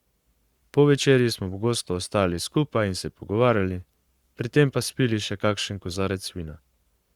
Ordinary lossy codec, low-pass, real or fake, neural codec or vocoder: Opus, 64 kbps; 19.8 kHz; fake; codec, 44.1 kHz, 7.8 kbps, Pupu-Codec